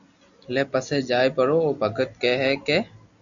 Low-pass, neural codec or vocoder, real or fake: 7.2 kHz; none; real